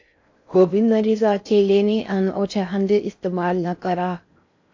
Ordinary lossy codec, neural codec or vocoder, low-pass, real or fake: AAC, 48 kbps; codec, 16 kHz in and 24 kHz out, 0.6 kbps, FocalCodec, streaming, 4096 codes; 7.2 kHz; fake